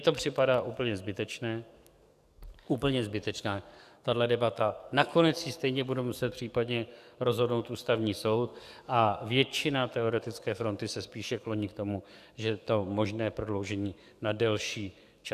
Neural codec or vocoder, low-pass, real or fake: codec, 44.1 kHz, 7.8 kbps, DAC; 14.4 kHz; fake